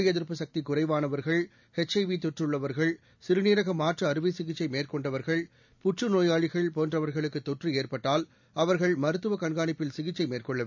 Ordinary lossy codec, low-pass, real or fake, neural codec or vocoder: none; 7.2 kHz; real; none